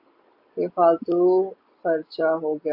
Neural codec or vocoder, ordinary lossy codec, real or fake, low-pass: none; AAC, 48 kbps; real; 5.4 kHz